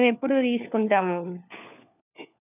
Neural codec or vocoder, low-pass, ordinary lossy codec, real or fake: codec, 16 kHz, 4 kbps, FunCodec, trained on LibriTTS, 50 frames a second; 3.6 kHz; none; fake